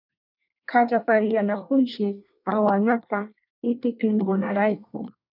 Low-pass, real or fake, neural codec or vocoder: 5.4 kHz; fake; codec, 24 kHz, 1 kbps, SNAC